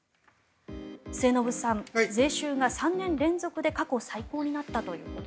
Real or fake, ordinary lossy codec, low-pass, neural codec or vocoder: real; none; none; none